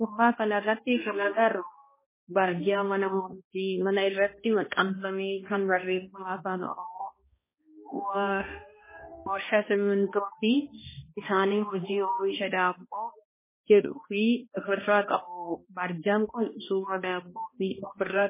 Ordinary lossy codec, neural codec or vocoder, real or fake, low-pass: MP3, 16 kbps; codec, 16 kHz, 1 kbps, X-Codec, HuBERT features, trained on balanced general audio; fake; 3.6 kHz